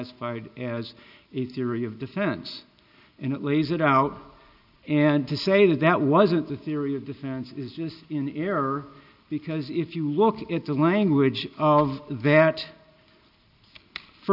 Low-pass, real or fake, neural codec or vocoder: 5.4 kHz; real; none